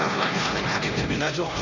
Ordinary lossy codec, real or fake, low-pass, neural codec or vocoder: none; fake; 7.2 kHz; codec, 16 kHz, 1 kbps, X-Codec, HuBERT features, trained on LibriSpeech